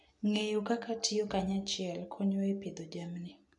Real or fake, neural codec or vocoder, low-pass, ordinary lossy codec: real; none; 10.8 kHz; none